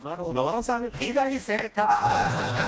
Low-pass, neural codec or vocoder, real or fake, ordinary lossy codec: none; codec, 16 kHz, 1 kbps, FreqCodec, smaller model; fake; none